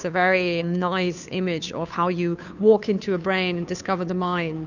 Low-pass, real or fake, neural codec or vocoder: 7.2 kHz; fake; codec, 16 kHz, 2 kbps, FunCodec, trained on Chinese and English, 25 frames a second